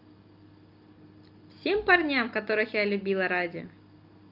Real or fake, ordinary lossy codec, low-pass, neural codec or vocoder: real; Opus, 24 kbps; 5.4 kHz; none